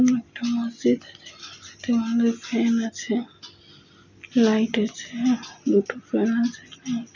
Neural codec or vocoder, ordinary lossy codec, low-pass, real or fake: none; none; 7.2 kHz; real